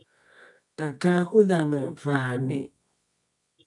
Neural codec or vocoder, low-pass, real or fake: codec, 24 kHz, 0.9 kbps, WavTokenizer, medium music audio release; 10.8 kHz; fake